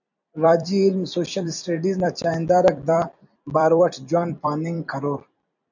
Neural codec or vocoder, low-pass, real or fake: vocoder, 44.1 kHz, 128 mel bands every 256 samples, BigVGAN v2; 7.2 kHz; fake